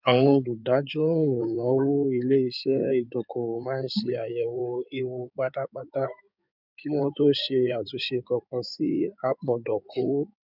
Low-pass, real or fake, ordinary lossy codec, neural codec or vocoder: 5.4 kHz; fake; none; codec, 16 kHz in and 24 kHz out, 2.2 kbps, FireRedTTS-2 codec